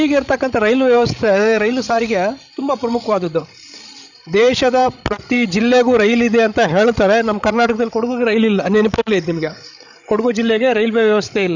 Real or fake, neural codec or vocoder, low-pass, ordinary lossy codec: fake; codec, 16 kHz, 16 kbps, FreqCodec, larger model; 7.2 kHz; none